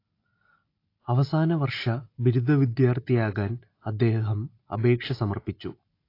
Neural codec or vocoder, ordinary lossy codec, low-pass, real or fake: none; AAC, 32 kbps; 5.4 kHz; real